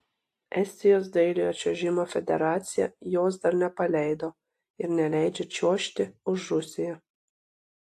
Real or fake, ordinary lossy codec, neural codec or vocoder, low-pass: real; AAC, 64 kbps; none; 14.4 kHz